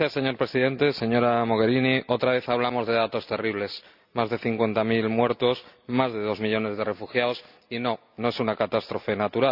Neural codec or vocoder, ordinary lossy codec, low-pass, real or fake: none; none; 5.4 kHz; real